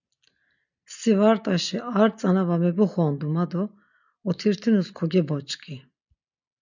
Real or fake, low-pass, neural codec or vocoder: real; 7.2 kHz; none